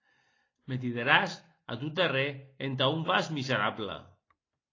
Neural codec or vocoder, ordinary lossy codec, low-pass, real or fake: none; AAC, 32 kbps; 7.2 kHz; real